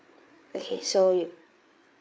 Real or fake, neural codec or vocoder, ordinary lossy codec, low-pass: fake; codec, 16 kHz, 8 kbps, FreqCodec, larger model; none; none